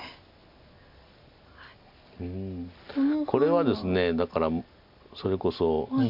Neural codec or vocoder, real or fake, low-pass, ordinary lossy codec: none; real; 5.4 kHz; MP3, 48 kbps